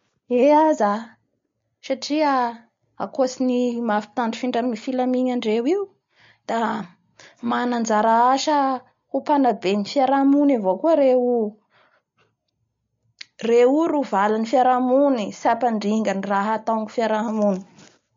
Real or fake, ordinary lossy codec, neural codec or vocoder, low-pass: real; MP3, 48 kbps; none; 7.2 kHz